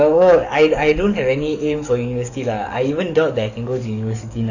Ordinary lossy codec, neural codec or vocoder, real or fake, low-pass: none; codec, 44.1 kHz, 7.8 kbps, DAC; fake; 7.2 kHz